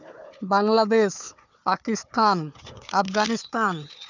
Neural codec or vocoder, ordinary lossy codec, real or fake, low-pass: codec, 16 kHz, 4 kbps, FunCodec, trained on Chinese and English, 50 frames a second; none; fake; 7.2 kHz